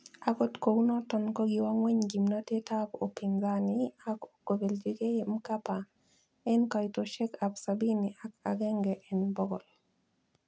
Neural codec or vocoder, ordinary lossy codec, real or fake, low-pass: none; none; real; none